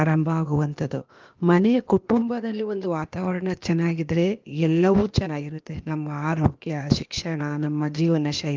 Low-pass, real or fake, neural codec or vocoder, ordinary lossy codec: 7.2 kHz; fake; codec, 16 kHz, 0.8 kbps, ZipCodec; Opus, 32 kbps